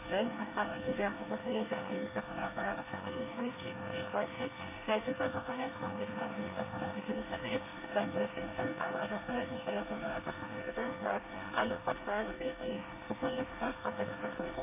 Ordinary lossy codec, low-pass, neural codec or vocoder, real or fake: none; 3.6 kHz; codec, 24 kHz, 1 kbps, SNAC; fake